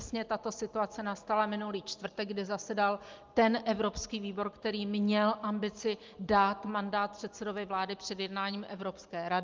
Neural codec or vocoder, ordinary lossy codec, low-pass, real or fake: none; Opus, 32 kbps; 7.2 kHz; real